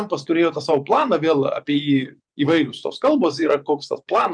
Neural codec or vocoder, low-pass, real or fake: vocoder, 24 kHz, 100 mel bands, Vocos; 9.9 kHz; fake